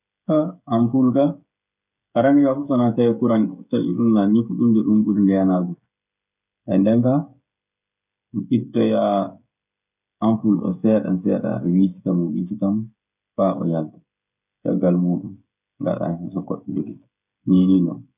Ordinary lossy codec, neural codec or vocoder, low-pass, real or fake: none; codec, 16 kHz, 16 kbps, FreqCodec, smaller model; 3.6 kHz; fake